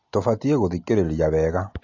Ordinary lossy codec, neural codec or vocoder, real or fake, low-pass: AAC, 48 kbps; none; real; 7.2 kHz